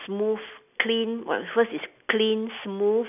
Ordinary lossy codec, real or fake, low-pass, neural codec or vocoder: none; real; 3.6 kHz; none